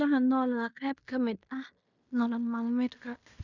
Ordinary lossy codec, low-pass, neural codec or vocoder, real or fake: none; 7.2 kHz; codec, 16 kHz in and 24 kHz out, 0.9 kbps, LongCat-Audio-Codec, fine tuned four codebook decoder; fake